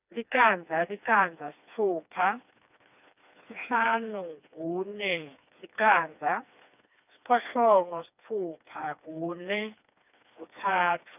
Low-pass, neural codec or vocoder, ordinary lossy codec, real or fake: 3.6 kHz; codec, 16 kHz, 2 kbps, FreqCodec, smaller model; none; fake